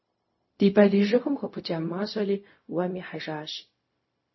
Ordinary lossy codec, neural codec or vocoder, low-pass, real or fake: MP3, 24 kbps; codec, 16 kHz, 0.4 kbps, LongCat-Audio-Codec; 7.2 kHz; fake